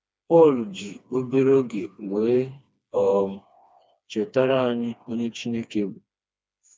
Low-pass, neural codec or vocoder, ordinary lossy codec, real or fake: none; codec, 16 kHz, 2 kbps, FreqCodec, smaller model; none; fake